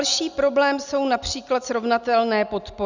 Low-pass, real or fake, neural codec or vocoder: 7.2 kHz; real; none